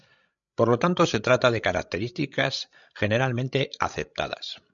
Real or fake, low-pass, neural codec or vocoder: fake; 7.2 kHz; codec, 16 kHz, 16 kbps, FreqCodec, larger model